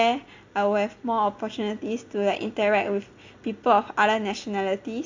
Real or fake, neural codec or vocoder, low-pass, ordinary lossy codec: real; none; 7.2 kHz; AAC, 48 kbps